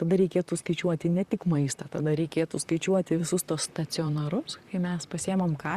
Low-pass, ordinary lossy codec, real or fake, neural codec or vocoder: 14.4 kHz; Opus, 64 kbps; fake; vocoder, 44.1 kHz, 128 mel bands, Pupu-Vocoder